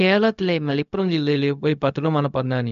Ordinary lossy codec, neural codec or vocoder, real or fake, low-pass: none; codec, 16 kHz, 0.4 kbps, LongCat-Audio-Codec; fake; 7.2 kHz